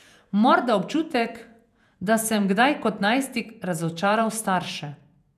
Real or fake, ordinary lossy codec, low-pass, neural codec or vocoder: fake; none; 14.4 kHz; vocoder, 48 kHz, 128 mel bands, Vocos